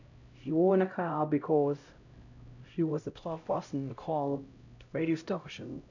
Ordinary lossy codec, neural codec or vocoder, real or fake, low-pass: none; codec, 16 kHz, 0.5 kbps, X-Codec, HuBERT features, trained on LibriSpeech; fake; 7.2 kHz